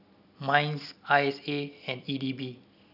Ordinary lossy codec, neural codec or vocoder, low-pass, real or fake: none; none; 5.4 kHz; real